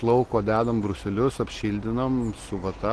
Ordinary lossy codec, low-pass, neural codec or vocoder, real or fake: Opus, 16 kbps; 10.8 kHz; none; real